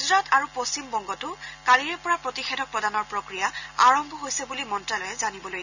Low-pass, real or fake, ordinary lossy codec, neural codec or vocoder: 7.2 kHz; real; none; none